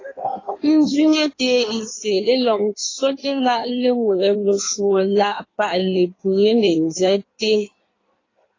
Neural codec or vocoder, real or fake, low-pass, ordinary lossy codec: codec, 16 kHz in and 24 kHz out, 1.1 kbps, FireRedTTS-2 codec; fake; 7.2 kHz; AAC, 32 kbps